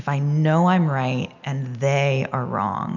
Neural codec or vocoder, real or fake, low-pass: none; real; 7.2 kHz